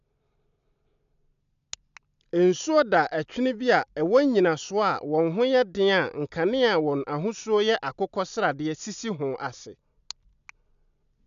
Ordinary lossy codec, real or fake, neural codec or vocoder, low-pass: none; real; none; 7.2 kHz